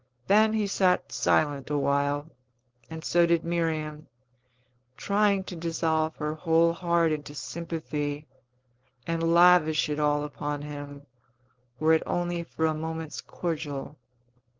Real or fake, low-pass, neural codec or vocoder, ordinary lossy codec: fake; 7.2 kHz; codec, 16 kHz, 4.8 kbps, FACodec; Opus, 16 kbps